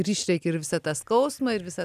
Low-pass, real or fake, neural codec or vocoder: 14.4 kHz; real; none